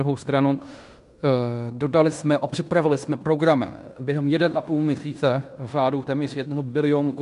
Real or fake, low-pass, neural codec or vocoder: fake; 10.8 kHz; codec, 16 kHz in and 24 kHz out, 0.9 kbps, LongCat-Audio-Codec, fine tuned four codebook decoder